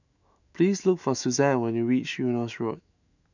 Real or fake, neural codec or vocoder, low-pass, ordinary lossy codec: fake; autoencoder, 48 kHz, 128 numbers a frame, DAC-VAE, trained on Japanese speech; 7.2 kHz; none